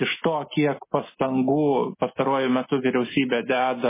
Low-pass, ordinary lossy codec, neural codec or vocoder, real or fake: 3.6 kHz; MP3, 16 kbps; none; real